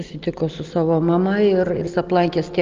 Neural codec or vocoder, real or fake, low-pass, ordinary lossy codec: none; real; 7.2 kHz; Opus, 24 kbps